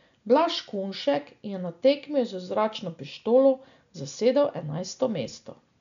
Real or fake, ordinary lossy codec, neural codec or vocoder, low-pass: real; none; none; 7.2 kHz